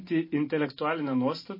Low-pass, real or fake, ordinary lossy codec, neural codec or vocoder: 5.4 kHz; real; MP3, 24 kbps; none